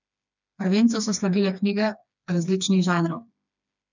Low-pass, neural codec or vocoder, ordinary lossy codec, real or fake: 7.2 kHz; codec, 16 kHz, 2 kbps, FreqCodec, smaller model; none; fake